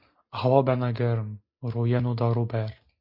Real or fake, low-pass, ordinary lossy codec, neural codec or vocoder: real; 5.4 kHz; MP3, 32 kbps; none